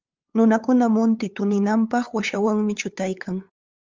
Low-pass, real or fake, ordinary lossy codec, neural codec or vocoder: 7.2 kHz; fake; Opus, 32 kbps; codec, 16 kHz, 8 kbps, FunCodec, trained on LibriTTS, 25 frames a second